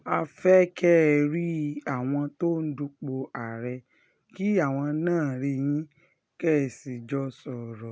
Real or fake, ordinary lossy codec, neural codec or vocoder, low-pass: real; none; none; none